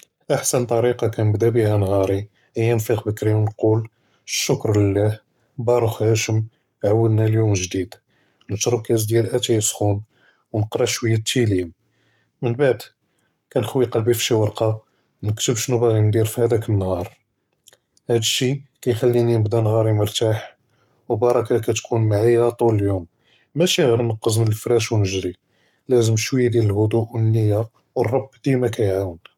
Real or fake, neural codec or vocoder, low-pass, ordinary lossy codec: fake; codec, 44.1 kHz, 7.8 kbps, Pupu-Codec; 19.8 kHz; none